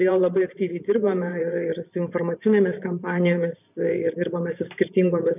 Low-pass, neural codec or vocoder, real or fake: 3.6 kHz; vocoder, 44.1 kHz, 128 mel bands every 512 samples, BigVGAN v2; fake